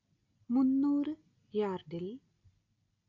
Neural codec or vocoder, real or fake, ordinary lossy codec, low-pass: none; real; AAC, 48 kbps; 7.2 kHz